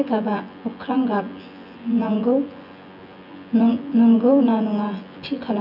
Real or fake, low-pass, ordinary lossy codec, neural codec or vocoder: fake; 5.4 kHz; none; vocoder, 24 kHz, 100 mel bands, Vocos